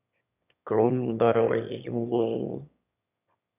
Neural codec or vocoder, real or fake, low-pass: autoencoder, 22.05 kHz, a latent of 192 numbers a frame, VITS, trained on one speaker; fake; 3.6 kHz